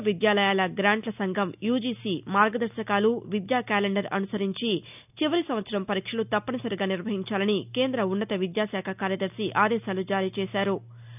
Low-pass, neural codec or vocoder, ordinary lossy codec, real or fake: 3.6 kHz; none; none; real